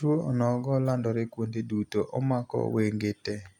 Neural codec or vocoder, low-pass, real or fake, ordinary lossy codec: none; 19.8 kHz; real; none